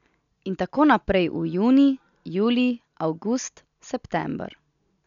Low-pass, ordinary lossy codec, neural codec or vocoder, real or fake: 7.2 kHz; none; none; real